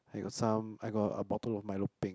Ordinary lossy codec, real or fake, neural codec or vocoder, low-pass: none; real; none; none